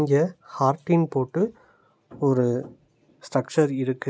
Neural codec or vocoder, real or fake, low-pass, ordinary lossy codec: none; real; none; none